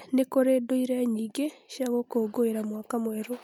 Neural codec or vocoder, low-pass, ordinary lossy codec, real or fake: none; 19.8 kHz; MP3, 96 kbps; real